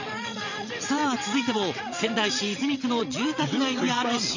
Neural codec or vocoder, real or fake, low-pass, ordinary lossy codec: vocoder, 22.05 kHz, 80 mel bands, WaveNeXt; fake; 7.2 kHz; none